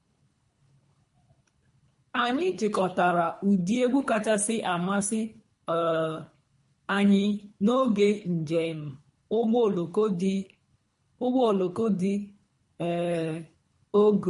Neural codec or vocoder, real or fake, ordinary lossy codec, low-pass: codec, 24 kHz, 3 kbps, HILCodec; fake; MP3, 48 kbps; 10.8 kHz